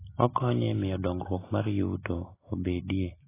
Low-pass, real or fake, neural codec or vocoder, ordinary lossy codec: 3.6 kHz; real; none; AAC, 16 kbps